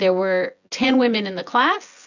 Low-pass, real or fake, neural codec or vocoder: 7.2 kHz; fake; vocoder, 24 kHz, 100 mel bands, Vocos